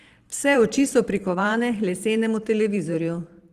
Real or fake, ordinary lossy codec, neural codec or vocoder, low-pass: fake; Opus, 24 kbps; vocoder, 44.1 kHz, 128 mel bands every 512 samples, BigVGAN v2; 14.4 kHz